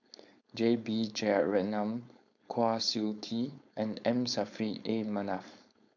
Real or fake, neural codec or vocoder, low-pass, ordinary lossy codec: fake; codec, 16 kHz, 4.8 kbps, FACodec; 7.2 kHz; none